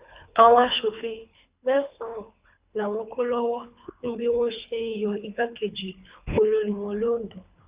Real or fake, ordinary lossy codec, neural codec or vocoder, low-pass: fake; Opus, 64 kbps; codec, 24 kHz, 3 kbps, HILCodec; 3.6 kHz